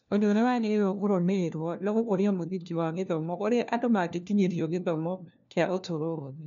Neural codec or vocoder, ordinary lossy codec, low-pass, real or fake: codec, 16 kHz, 1 kbps, FunCodec, trained on LibriTTS, 50 frames a second; none; 7.2 kHz; fake